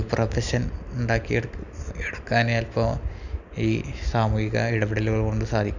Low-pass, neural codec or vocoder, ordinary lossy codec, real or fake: 7.2 kHz; none; none; real